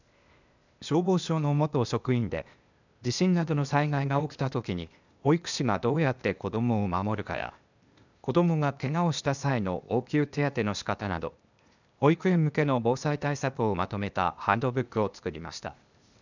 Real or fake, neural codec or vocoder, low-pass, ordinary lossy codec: fake; codec, 16 kHz, 0.8 kbps, ZipCodec; 7.2 kHz; none